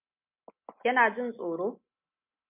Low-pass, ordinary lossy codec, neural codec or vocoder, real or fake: 3.6 kHz; MP3, 32 kbps; none; real